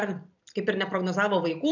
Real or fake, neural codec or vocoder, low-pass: real; none; 7.2 kHz